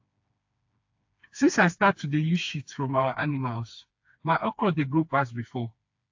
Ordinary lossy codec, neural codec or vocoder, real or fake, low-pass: AAC, 48 kbps; codec, 16 kHz, 2 kbps, FreqCodec, smaller model; fake; 7.2 kHz